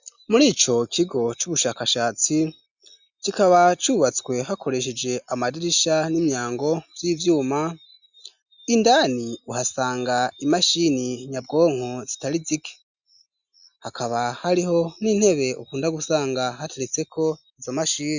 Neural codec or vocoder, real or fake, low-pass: none; real; 7.2 kHz